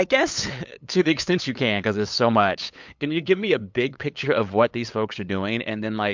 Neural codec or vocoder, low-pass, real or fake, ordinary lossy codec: codec, 16 kHz, 8 kbps, FunCodec, trained on LibriTTS, 25 frames a second; 7.2 kHz; fake; MP3, 64 kbps